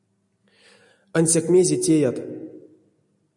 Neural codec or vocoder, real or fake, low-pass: none; real; 10.8 kHz